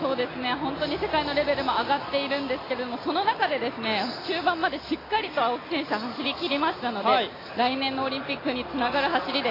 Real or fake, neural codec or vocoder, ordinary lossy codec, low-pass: real; none; AAC, 24 kbps; 5.4 kHz